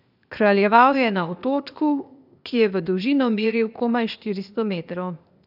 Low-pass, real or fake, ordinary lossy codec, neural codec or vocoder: 5.4 kHz; fake; none; codec, 16 kHz, 0.8 kbps, ZipCodec